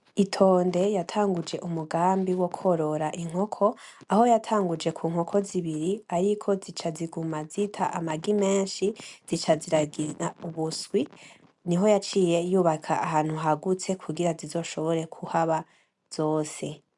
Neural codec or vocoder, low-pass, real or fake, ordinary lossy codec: none; 10.8 kHz; real; MP3, 96 kbps